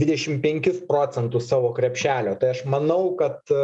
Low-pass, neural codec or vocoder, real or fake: 10.8 kHz; none; real